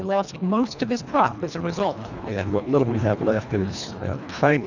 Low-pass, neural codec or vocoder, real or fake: 7.2 kHz; codec, 24 kHz, 1.5 kbps, HILCodec; fake